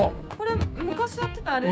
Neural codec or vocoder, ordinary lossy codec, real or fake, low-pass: codec, 16 kHz, 6 kbps, DAC; none; fake; none